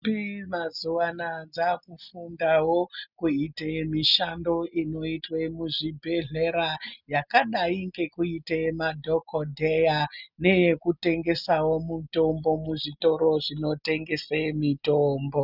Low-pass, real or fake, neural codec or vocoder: 5.4 kHz; real; none